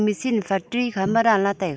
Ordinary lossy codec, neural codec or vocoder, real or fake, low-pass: none; none; real; none